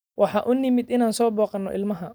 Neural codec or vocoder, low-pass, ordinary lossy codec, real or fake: none; none; none; real